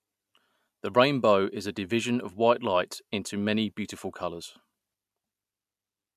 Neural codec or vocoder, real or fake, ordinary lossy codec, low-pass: none; real; MP3, 96 kbps; 14.4 kHz